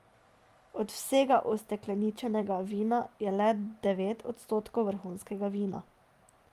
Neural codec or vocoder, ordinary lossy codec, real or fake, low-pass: none; Opus, 32 kbps; real; 14.4 kHz